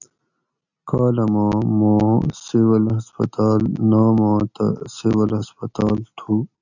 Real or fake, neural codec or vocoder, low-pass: real; none; 7.2 kHz